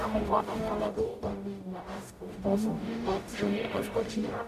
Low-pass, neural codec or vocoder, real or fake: 14.4 kHz; codec, 44.1 kHz, 0.9 kbps, DAC; fake